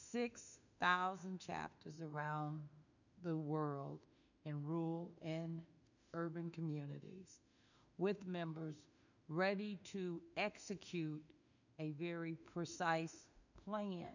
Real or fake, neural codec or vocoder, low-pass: fake; autoencoder, 48 kHz, 32 numbers a frame, DAC-VAE, trained on Japanese speech; 7.2 kHz